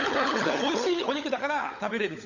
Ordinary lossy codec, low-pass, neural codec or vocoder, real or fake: none; 7.2 kHz; codec, 16 kHz, 16 kbps, FunCodec, trained on LibriTTS, 50 frames a second; fake